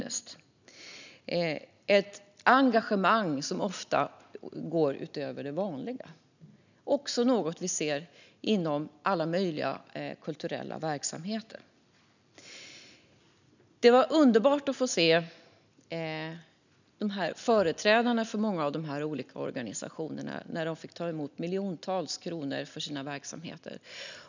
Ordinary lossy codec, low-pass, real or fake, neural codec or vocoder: none; 7.2 kHz; real; none